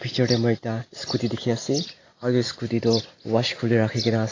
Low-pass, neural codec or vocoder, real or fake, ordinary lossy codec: 7.2 kHz; none; real; AAC, 32 kbps